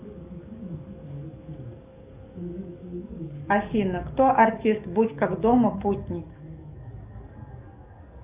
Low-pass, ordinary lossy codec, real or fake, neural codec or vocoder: 3.6 kHz; Opus, 64 kbps; fake; codec, 44.1 kHz, 7.8 kbps, DAC